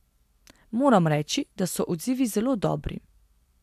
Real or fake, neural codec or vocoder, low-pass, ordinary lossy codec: real; none; 14.4 kHz; AAC, 96 kbps